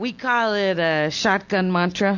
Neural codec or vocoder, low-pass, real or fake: none; 7.2 kHz; real